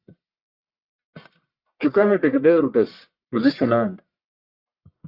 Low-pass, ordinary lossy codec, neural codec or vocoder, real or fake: 5.4 kHz; Opus, 64 kbps; codec, 44.1 kHz, 1.7 kbps, Pupu-Codec; fake